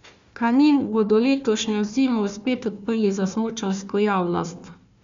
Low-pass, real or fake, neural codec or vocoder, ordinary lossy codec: 7.2 kHz; fake; codec, 16 kHz, 1 kbps, FunCodec, trained on Chinese and English, 50 frames a second; MP3, 64 kbps